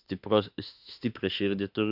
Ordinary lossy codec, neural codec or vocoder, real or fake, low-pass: AAC, 48 kbps; autoencoder, 48 kHz, 32 numbers a frame, DAC-VAE, trained on Japanese speech; fake; 5.4 kHz